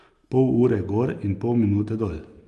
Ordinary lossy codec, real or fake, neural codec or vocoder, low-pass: Opus, 32 kbps; real; none; 10.8 kHz